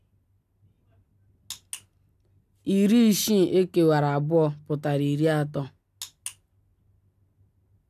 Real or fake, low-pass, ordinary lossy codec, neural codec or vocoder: real; 14.4 kHz; none; none